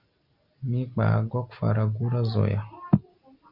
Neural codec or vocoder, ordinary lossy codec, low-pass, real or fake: none; Opus, 64 kbps; 5.4 kHz; real